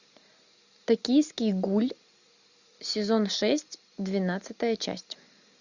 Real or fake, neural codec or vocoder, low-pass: real; none; 7.2 kHz